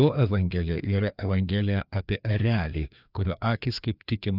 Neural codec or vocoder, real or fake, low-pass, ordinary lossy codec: codec, 16 kHz, 2 kbps, FreqCodec, larger model; fake; 5.4 kHz; Opus, 64 kbps